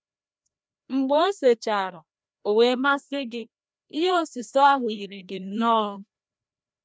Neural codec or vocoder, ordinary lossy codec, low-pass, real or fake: codec, 16 kHz, 1 kbps, FreqCodec, larger model; none; none; fake